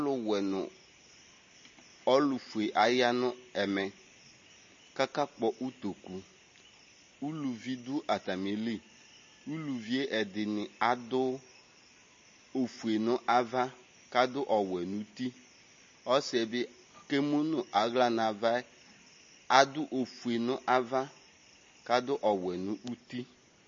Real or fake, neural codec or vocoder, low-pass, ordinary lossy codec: real; none; 7.2 kHz; MP3, 32 kbps